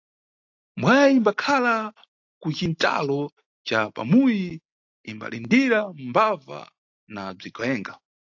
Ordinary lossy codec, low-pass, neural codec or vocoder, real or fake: AAC, 48 kbps; 7.2 kHz; none; real